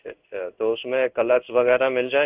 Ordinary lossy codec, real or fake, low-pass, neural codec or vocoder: Opus, 24 kbps; fake; 3.6 kHz; codec, 16 kHz in and 24 kHz out, 1 kbps, XY-Tokenizer